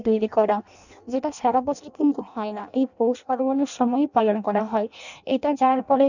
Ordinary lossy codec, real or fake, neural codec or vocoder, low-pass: none; fake; codec, 16 kHz in and 24 kHz out, 0.6 kbps, FireRedTTS-2 codec; 7.2 kHz